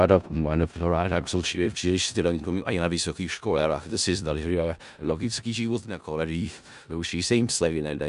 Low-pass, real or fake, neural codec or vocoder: 10.8 kHz; fake; codec, 16 kHz in and 24 kHz out, 0.4 kbps, LongCat-Audio-Codec, four codebook decoder